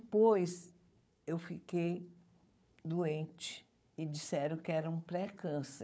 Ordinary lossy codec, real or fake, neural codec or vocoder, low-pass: none; fake; codec, 16 kHz, 4 kbps, FunCodec, trained on Chinese and English, 50 frames a second; none